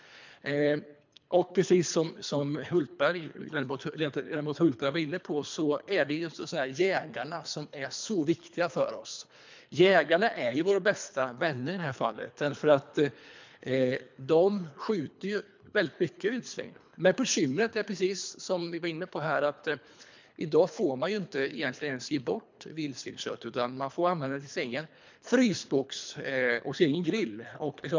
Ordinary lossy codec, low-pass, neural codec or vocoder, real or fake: MP3, 64 kbps; 7.2 kHz; codec, 24 kHz, 3 kbps, HILCodec; fake